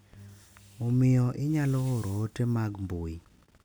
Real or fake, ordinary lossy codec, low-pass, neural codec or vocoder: real; none; none; none